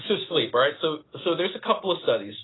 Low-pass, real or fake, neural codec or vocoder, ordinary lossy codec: 7.2 kHz; real; none; AAC, 16 kbps